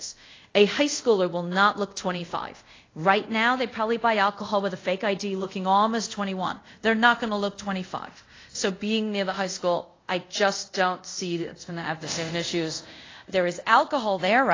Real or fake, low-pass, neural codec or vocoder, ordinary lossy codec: fake; 7.2 kHz; codec, 24 kHz, 0.5 kbps, DualCodec; AAC, 32 kbps